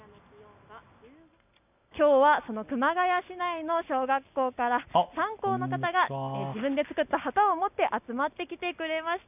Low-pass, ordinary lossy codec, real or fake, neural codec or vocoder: 3.6 kHz; none; real; none